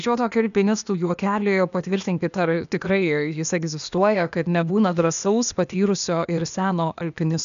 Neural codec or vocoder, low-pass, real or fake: codec, 16 kHz, 0.8 kbps, ZipCodec; 7.2 kHz; fake